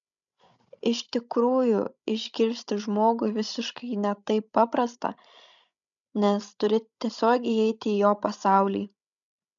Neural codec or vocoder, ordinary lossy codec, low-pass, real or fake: codec, 16 kHz, 16 kbps, FreqCodec, larger model; MP3, 96 kbps; 7.2 kHz; fake